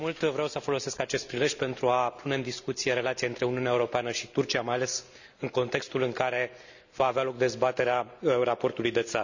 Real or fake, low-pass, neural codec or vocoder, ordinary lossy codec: real; 7.2 kHz; none; none